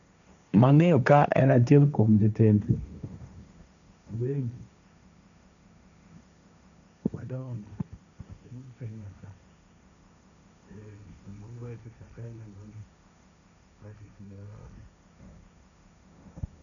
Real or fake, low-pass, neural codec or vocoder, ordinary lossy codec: fake; 7.2 kHz; codec, 16 kHz, 1.1 kbps, Voila-Tokenizer; none